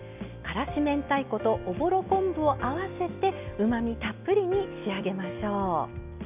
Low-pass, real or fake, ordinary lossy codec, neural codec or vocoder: 3.6 kHz; real; none; none